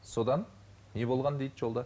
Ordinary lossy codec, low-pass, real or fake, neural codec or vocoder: none; none; real; none